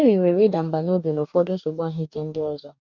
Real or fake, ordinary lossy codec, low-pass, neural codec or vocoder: fake; none; 7.2 kHz; codec, 44.1 kHz, 2.6 kbps, DAC